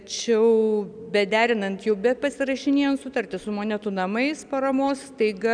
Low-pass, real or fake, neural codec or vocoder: 9.9 kHz; real; none